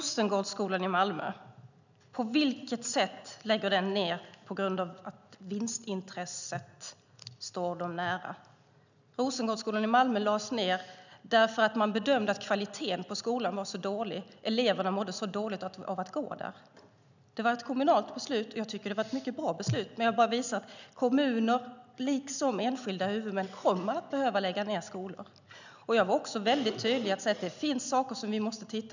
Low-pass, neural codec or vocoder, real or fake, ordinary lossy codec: 7.2 kHz; none; real; none